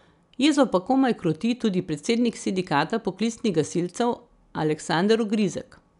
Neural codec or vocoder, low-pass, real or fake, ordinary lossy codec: none; 10.8 kHz; real; none